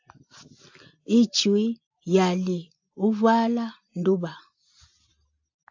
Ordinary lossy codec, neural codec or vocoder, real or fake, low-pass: AAC, 48 kbps; none; real; 7.2 kHz